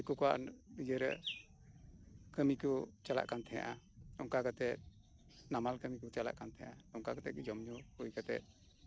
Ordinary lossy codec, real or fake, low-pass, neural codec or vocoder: none; real; none; none